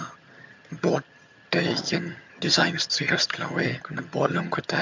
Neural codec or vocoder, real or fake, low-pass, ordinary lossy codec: vocoder, 22.05 kHz, 80 mel bands, HiFi-GAN; fake; 7.2 kHz; MP3, 64 kbps